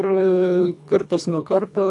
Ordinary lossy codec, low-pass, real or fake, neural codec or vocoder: AAC, 64 kbps; 10.8 kHz; fake; codec, 24 kHz, 1.5 kbps, HILCodec